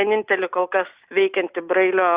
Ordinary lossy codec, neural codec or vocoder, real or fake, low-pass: Opus, 64 kbps; none; real; 3.6 kHz